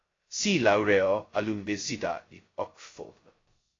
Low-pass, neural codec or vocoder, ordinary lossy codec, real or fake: 7.2 kHz; codec, 16 kHz, 0.2 kbps, FocalCodec; AAC, 32 kbps; fake